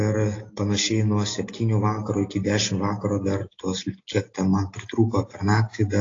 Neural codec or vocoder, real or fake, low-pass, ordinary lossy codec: none; real; 7.2 kHz; AAC, 32 kbps